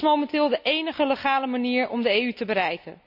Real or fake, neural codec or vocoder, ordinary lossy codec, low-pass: real; none; none; 5.4 kHz